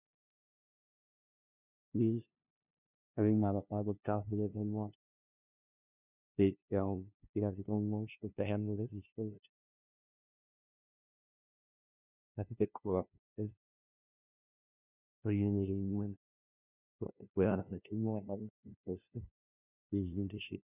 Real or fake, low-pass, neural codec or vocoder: fake; 3.6 kHz; codec, 16 kHz, 0.5 kbps, FunCodec, trained on LibriTTS, 25 frames a second